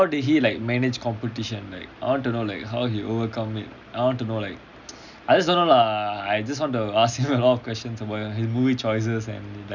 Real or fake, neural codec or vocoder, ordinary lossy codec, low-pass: real; none; none; 7.2 kHz